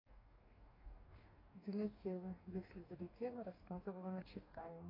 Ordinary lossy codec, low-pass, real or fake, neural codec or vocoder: AAC, 24 kbps; 5.4 kHz; fake; codec, 44.1 kHz, 2.6 kbps, DAC